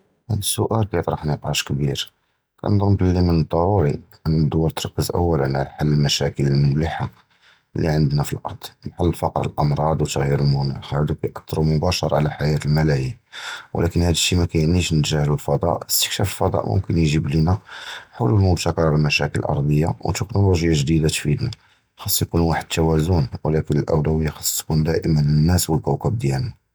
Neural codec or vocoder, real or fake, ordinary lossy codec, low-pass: none; real; none; none